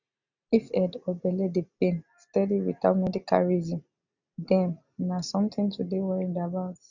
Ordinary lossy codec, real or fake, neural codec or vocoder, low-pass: none; real; none; 7.2 kHz